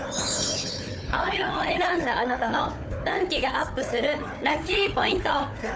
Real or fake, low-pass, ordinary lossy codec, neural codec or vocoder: fake; none; none; codec, 16 kHz, 4 kbps, FunCodec, trained on Chinese and English, 50 frames a second